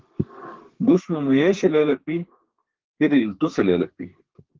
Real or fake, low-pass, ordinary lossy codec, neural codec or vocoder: fake; 7.2 kHz; Opus, 16 kbps; codec, 32 kHz, 1.9 kbps, SNAC